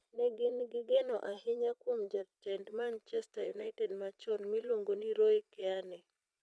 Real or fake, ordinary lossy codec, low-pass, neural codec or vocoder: fake; none; none; vocoder, 22.05 kHz, 80 mel bands, Vocos